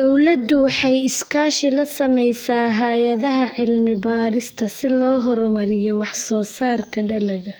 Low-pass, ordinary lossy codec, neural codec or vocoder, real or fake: none; none; codec, 44.1 kHz, 2.6 kbps, SNAC; fake